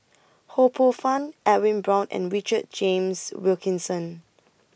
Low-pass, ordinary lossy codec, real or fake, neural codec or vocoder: none; none; real; none